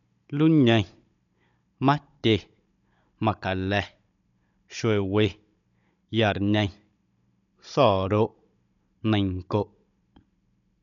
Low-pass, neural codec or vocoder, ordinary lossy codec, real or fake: 7.2 kHz; codec, 16 kHz, 16 kbps, FunCodec, trained on Chinese and English, 50 frames a second; none; fake